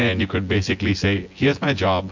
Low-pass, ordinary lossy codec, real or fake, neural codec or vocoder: 7.2 kHz; MP3, 48 kbps; fake; vocoder, 24 kHz, 100 mel bands, Vocos